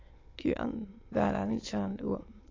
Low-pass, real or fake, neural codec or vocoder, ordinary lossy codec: 7.2 kHz; fake; autoencoder, 22.05 kHz, a latent of 192 numbers a frame, VITS, trained on many speakers; AAC, 32 kbps